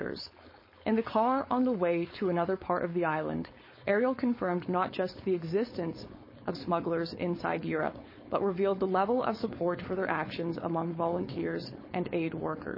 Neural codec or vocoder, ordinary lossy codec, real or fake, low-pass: codec, 16 kHz, 4.8 kbps, FACodec; MP3, 24 kbps; fake; 5.4 kHz